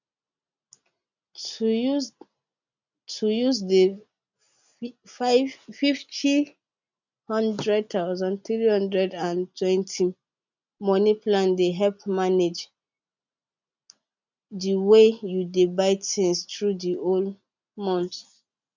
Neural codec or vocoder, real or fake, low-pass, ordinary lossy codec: none; real; 7.2 kHz; none